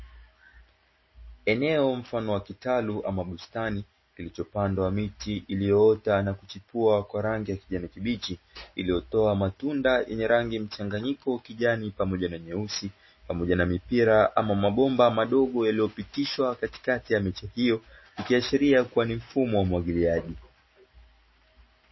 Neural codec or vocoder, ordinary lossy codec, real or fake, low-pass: none; MP3, 24 kbps; real; 7.2 kHz